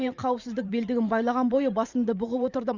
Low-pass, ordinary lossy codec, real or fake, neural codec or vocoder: 7.2 kHz; Opus, 64 kbps; fake; vocoder, 44.1 kHz, 128 mel bands every 512 samples, BigVGAN v2